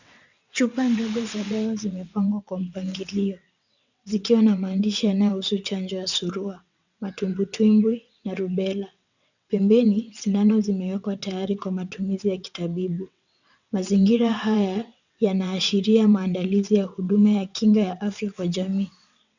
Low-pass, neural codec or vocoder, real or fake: 7.2 kHz; vocoder, 22.05 kHz, 80 mel bands, WaveNeXt; fake